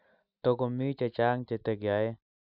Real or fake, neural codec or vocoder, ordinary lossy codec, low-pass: real; none; none; 5.4 kHz